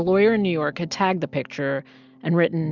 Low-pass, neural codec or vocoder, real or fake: 7.2 kHz; none; real